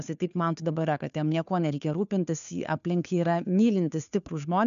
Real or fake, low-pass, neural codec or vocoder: fake; 7.2 kHz; codec, 16 kHz, 2 kbps, FunCodec, trained on Chinese and English, 25 frames a second